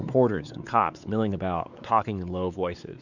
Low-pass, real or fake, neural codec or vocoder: 7.2 kHz; fake; codec, 16 kHz, 4 kbps, X-Codec, WavLM features, trained on Multilingual LibriSpeech